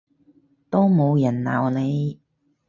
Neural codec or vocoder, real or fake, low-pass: none; real; 7.2 kHz